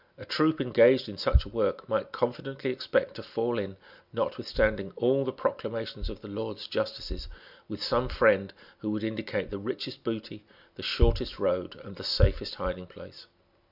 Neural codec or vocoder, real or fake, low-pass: none; real; 5.4 kHz